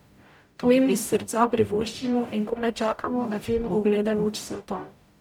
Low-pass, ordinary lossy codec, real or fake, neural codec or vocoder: 19.8 kHz; none; fake; codec, 44.1 kHz, 0.9 kbps, DAC